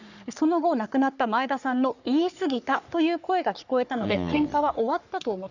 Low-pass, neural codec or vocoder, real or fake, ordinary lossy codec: 7.2 kHz; codec, 44.1 kHz, 3.4 kbps, Pupu-Codec; fake; none